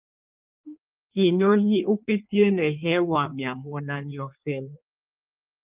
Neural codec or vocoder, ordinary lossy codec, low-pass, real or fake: codec, 16 kHz in and 24 kHz out, 1.1 kbps, FireRedTTS-2 codec; Opus, 24 kbps; 3.6 kHz; fake